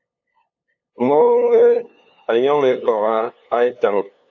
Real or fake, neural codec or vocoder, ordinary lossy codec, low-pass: fake; codec, 16 kHz, 2 kbps, FunCodec, trained on LibriTTS, 25 frames a second; AAC, 48 kbps; 7.2 kHz